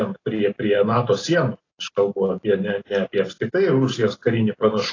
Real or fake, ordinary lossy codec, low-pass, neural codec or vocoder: real; AAC, 32 kbps; 7.2 kHz; none